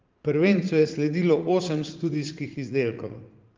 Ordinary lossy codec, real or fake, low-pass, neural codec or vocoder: Opus, 32 kbps; fake; 7.2 kHz; vocoder, 44.1 kHz, 80 mel bands, Vocos